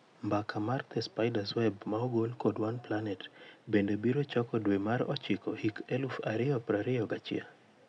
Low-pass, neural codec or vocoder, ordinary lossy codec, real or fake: 9.9 kHz; none; none; real